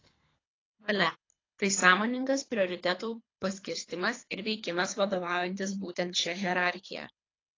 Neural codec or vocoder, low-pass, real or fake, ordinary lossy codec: codec, 24 kHz, 3 kbps, HILCodec; 7.2 kHz; fake; AAC, 32 kbps